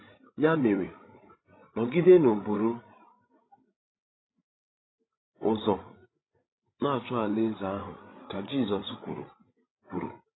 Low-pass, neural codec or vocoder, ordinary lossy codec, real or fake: 7.2 kHz; codec, 16 kHz, 16 kbps, FreqCodec, larger model; AAC, 16 kbps; fake